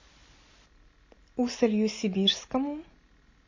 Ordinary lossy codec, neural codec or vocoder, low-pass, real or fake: MP3, 32 kbps; none; 7.2 kHz; real